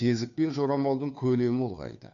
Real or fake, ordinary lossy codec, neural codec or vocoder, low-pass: fake; none; codec, 16 kHz, 2 kbps, FunCodec, trained on Chinese and English, 25 frames a second; 7.2 kHz